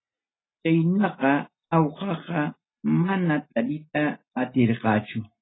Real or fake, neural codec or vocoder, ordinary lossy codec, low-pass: real; none; AAC, 16 kbps; 7.2 kHz